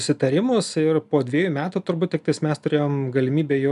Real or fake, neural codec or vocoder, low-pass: real; none; 10.8 kHz